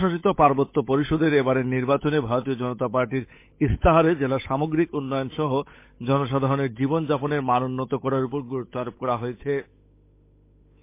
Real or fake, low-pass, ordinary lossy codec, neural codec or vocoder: fake; 3.6 kHz; MP3, 24 kbps; codec, 16 kHz, 8 kbps, FunCodec, trained on Chinese and English, 25 frames a second